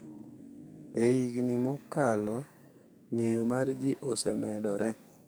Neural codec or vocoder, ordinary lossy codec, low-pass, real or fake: codec, 44.1 kHz, 2.6 kbps, SNAC; none; none; fake